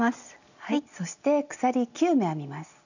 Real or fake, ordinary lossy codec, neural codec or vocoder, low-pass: real; none; none; 7.2 kHz